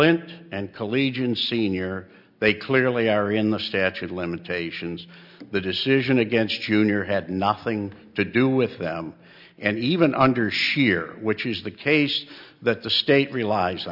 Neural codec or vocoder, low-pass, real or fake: none; 5.4 kHz; real